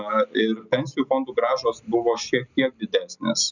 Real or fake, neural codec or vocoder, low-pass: real; none; 7.2 kHz